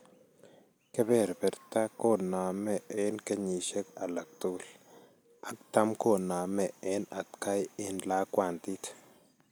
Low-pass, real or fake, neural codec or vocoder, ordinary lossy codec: none; real; none; none